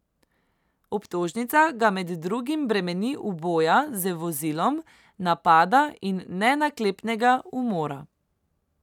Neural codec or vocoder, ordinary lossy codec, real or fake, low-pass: none; none; real; 19.8 kHz